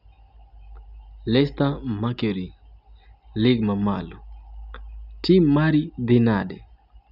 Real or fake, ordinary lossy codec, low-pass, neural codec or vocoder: real; none; 5.4 kHz; none